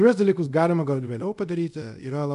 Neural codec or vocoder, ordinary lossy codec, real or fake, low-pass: codec, 24 kHz, 0.5 kbps, DualCodec; MP3, 96 kbps; fake; 10.8 kHz